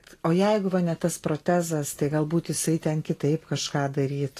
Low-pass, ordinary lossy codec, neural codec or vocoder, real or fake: 14.4 kHz; AAC, 48 kbps; none; real